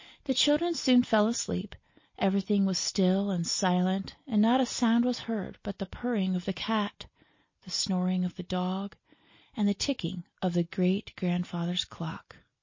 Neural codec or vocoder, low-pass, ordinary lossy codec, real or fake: none; 7.2 kHz; MP3, 32 kbps; real